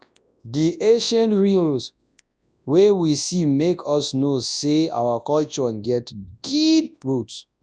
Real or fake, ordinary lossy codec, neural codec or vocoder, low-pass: fake; none; codec, 24 kHz, 0.9 kbps, WavTokenizer, large speech release; 9.9 kHz